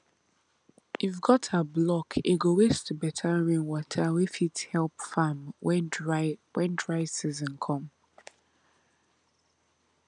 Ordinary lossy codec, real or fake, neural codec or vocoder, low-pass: none; real; none; 9.9 kHz